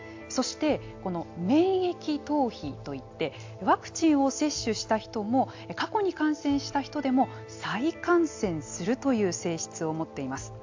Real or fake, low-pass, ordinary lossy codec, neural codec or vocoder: real; 7.2 kHz; AAC, 48 kbps; none